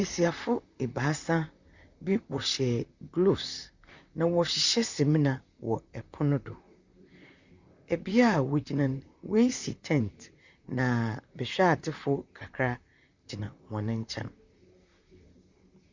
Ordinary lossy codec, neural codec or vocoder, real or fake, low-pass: Opus, 64 kbps; vocoder, 44.1 kHz, 128 mel bands, Pupu-Vocoder; fake; 7.2 kHz